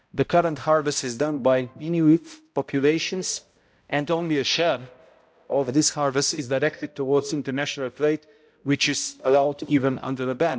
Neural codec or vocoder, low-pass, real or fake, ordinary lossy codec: codec, 16 kHz, 0.5 kbps, X-Codec, HuBERT features, trained on balanced general audio; none; fake; none